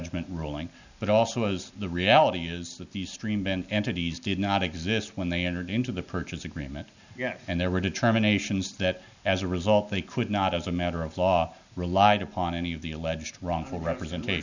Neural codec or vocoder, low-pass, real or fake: none; 7.2 kHz; real